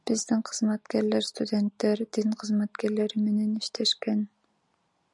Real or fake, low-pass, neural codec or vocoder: real; 10.8 kHz; none